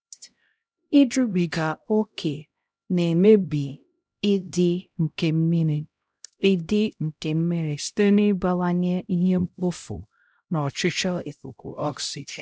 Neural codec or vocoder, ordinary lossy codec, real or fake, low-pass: codec, 16 kHz, 0.5 kbps, X-Codec, HuBERT features, trained on LibriSpeech; none; fake; none